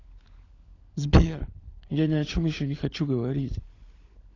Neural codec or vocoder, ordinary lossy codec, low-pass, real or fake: codec, 16 kHz, 16 kbps, FunCodec, trained on LibriTTS, 50 frames a second; AAC, 32 kbps; 7.2 kHz; fake